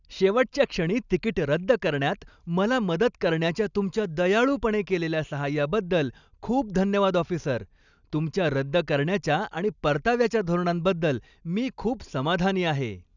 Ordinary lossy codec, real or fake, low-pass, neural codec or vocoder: none; real; 7.2 kHz; none